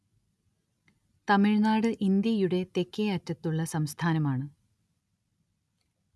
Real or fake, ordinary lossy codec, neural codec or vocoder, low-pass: real; none; none; none